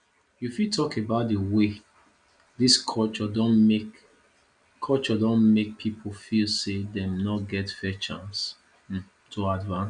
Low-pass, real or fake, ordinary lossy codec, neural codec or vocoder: 9.9 kHz; real; none; none